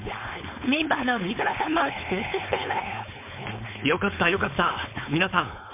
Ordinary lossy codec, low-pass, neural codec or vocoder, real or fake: MP3, 32 kbps; 3.6 kHz; codec, 16 kHz, 4.8 kbps, FACodec; fake